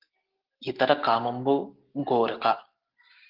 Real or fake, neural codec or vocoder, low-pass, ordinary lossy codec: real; none; 5.4 kHz; Opus, 16 kbps